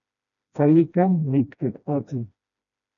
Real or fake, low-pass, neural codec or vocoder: fake; 7.2 kHz; codec, 16 kHz, 1 kbps, FreqCodec, smaller model